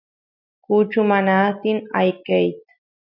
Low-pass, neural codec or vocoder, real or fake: 5.4 kHz; none; real